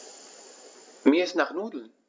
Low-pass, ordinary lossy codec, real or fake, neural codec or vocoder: none; none; real; none